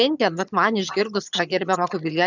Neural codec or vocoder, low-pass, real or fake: vocoder, 22.05 kHz, 80 mel bands, HiFi-GAN; 7.2 kHz; fake